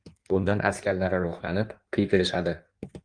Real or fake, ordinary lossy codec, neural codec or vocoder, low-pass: fake; Opus, 32 kbps; codec, 16 kHz in and 24 kHz out, 1.1 kbps, FireRedTTS-2 codec; 9.9 kHz